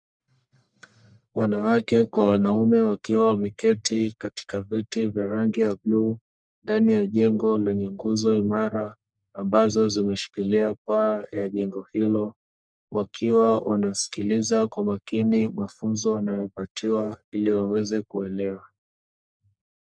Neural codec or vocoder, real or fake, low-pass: codec, 44.1 kHz, 1.7 kbps, Pupu-Codec; fake; 9.9 kHz